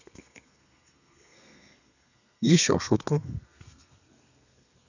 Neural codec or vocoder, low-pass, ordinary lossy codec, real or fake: codec, 32 kHz, 1.9 kbps, SNAC; 7.2 kHz; none; fake